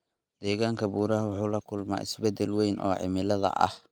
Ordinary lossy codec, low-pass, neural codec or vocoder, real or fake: Opus, 32 kbps; 19.8 kHz; none; real